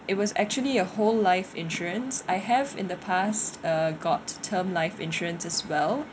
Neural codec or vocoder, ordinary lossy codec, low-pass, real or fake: none; none; none; real